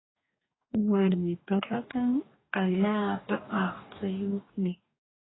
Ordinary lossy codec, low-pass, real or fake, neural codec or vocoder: AAC, 16 kbps; 7.2 kHz; fake; codec, 44.1 kHz, 2.6 kbps, DAC